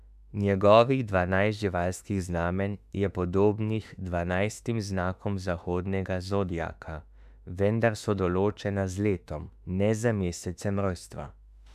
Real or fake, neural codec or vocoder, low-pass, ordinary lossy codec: fake; autoencoder, 48 kHz, 32 numbers a frame, DAC-VAE, trained on Japanese speech; 14.4 kHz; none